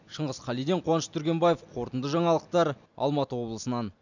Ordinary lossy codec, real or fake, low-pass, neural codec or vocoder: none; real; 7.2 kHz; none